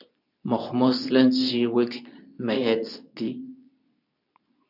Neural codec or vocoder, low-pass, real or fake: codec, 24 kHz, 0.9 kbps, WavTokenizer, medium speech release version 2; 5.4 kHz; fake